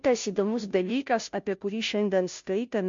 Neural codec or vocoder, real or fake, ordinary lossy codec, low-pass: codec, 16 kHz, 0.5 kbps, FunCodec, trained on Chinese and English, 25 frames a second; fake; MP3, 48 kbps; 7.2 kHz